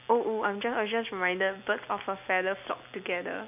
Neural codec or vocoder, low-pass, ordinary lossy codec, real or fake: none; 3.6 kHz; none; real